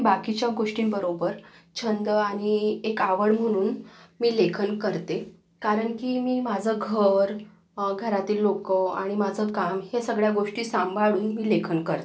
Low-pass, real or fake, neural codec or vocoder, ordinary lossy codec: none; real; none; none